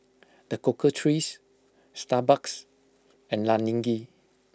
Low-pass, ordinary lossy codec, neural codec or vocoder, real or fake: none; none; none; real